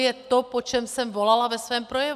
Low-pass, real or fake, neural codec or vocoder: 14.4 kHz; real; none